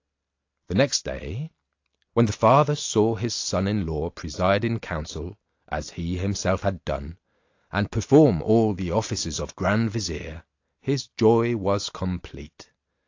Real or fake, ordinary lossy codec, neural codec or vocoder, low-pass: real; AAC, 48 kbps; none; 7.2 kHz